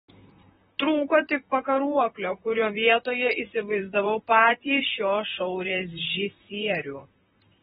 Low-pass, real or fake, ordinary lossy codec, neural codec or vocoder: 19.8 kHz; fake; AAC, 16 kbps; vocoder, 44.1 kHz, 128 mel bands, Pupu-Vocoder